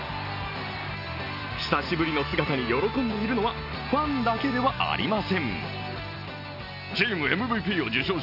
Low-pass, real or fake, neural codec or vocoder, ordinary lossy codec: 5.4 kHz; real; none; none